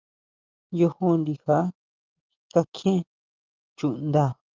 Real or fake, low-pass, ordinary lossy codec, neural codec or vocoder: real; 7.2 kHz; Opus, 24 kbps; none